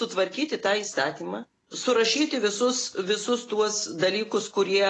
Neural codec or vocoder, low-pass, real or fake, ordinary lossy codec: none; 9.9 kHz; real; AAC, 32 kbps